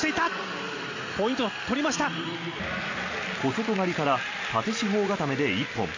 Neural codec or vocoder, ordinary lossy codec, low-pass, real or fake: none; MP3, 32 kbps; 7.2 kHz; real